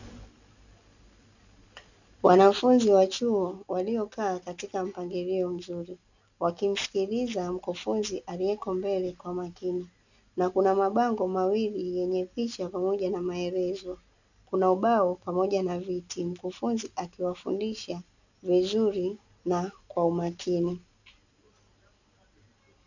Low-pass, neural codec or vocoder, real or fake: 7.2 kHz; none; real